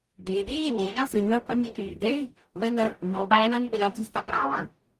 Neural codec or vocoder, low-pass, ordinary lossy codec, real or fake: codec, 44.1 kHz, 0.9 kbps, DAC; 14.4 kHz; Opus, 24 kbps; fake